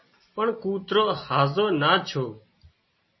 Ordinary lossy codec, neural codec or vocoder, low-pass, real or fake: MP3, 24 kbps; none; 7.2 kHz; real